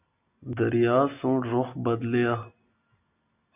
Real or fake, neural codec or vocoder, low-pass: real; none; 3.6 kHz